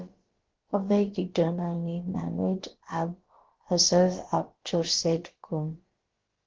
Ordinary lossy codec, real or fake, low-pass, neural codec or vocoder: Opus, 16 kbps; fake; 7.2 kHz; codec, 16 kHz, about 1 kbps, DyCAST, with the encoder's durations